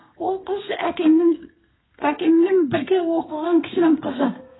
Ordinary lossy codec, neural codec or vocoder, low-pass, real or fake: AAC, 16 kbps; codec, 32 kHz, 1.9 kbps, SNAC; 7.2 kHz; fake